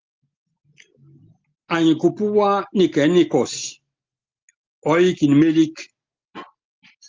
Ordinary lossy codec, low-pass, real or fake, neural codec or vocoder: Opus, 32 kbps; 7.2 kHz; real; none